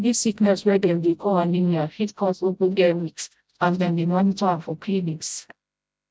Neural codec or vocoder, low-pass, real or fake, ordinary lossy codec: codec, 16 kHz, 0.5 kbps, FreqCodec, smaller model; none; fake; none